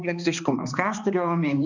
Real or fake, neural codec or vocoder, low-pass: fake; codec, 16 kHz, 2 kbps, X-Codec, HuBERT features, trained on balanced general audio; 7.2 kHz